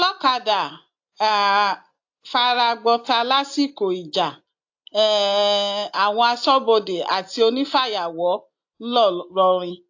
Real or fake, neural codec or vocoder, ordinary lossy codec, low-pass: real; none; AAC, 48 kbps; 7.2 kHz